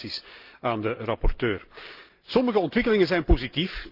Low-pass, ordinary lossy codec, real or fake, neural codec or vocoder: 5.4 kHz; Opus, 32 kbps; real; none